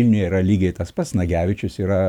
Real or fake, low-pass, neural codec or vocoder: real; 19.8 kHz; none